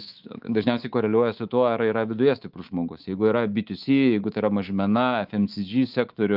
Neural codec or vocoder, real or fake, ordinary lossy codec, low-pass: codec, 24 kHz, 3.1 kbps, DualCodec; fake; Opus, 32 kbps; 5.4 kHz